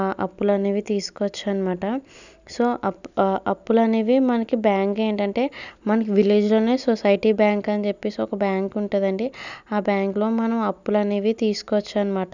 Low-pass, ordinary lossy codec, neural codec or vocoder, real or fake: 7.2 kHz; none; none; real